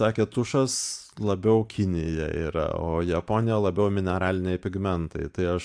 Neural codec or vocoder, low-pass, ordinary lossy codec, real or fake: vocoder, 44.1 kHz, 128 mel bands every 512 samples, BigVGAN v2; 9.9 kHz; AAC, 64 kbps; fake